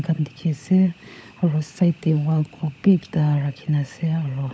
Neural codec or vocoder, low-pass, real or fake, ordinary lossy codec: codec, 16 kHz, 16 kbps, FunCodec, trained on LibriTTS, 50 frames a second; none; fake; none